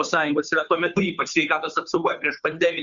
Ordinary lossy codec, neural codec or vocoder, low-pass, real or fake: Opus, 64 kbps; codec, 16 kHz, 2 kbps, FunCodec, trained on Chinese and English, 25 frames a second; 7.2 kHz; fake